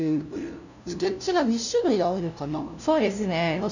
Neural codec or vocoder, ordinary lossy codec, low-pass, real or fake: codec, 16 kHz, 0.5 kbps, FunCodec, trained on LibriTTS, 25 frames a second; none; 7.2 kHz; fake